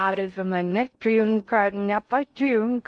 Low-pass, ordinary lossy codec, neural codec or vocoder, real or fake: 9.9 kHz; MP3, 64 kbps; codec, 16 kHz in and 24 kHz out, 0.6 kbps, FocalCodec, streaming, 2048 codes; fake